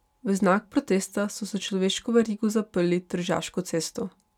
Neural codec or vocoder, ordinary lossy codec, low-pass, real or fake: none; none; 19.8 kHz; real